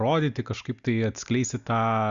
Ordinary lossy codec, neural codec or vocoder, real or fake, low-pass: Opus, 64 kbps; none; real; 7.2 kHz